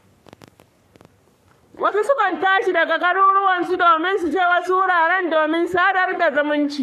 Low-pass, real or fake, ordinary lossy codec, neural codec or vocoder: 14.4 kHz; fake; none; codec, 44.1 kHz, 3.4 kbps, Pupu-Codec